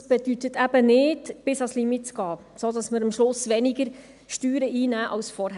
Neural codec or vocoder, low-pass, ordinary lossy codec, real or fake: none; 10.8 kHz; MP3, 96 kbps; real